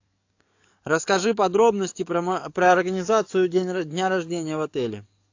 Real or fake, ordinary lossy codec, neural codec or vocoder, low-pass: fake; AAC, 48 kbps; codec, 44.1 kHz, 7.8 kbps, DAC; 7.2 kHz